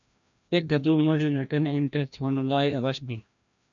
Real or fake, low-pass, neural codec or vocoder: fake; 7.2 kHz; codec, 16 kHz, 1 kbps, FreqCodec, larger model